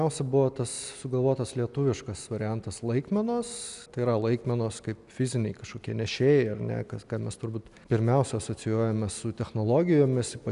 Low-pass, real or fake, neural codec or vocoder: 10.8 kHz; real; none